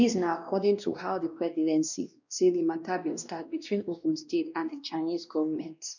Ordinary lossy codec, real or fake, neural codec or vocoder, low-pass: none; fake; codec, 16 kHz, 1 kbps, X-Codec, WavLM features, trained on Multilingual LibriSpeech; 7.2 kHz